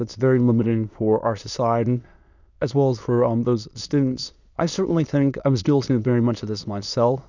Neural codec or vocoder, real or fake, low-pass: autoencoder, 22.05 kHz, a latent of 192 numbers a frame, VITS, trained on many speakers; fake; 7.2 kHz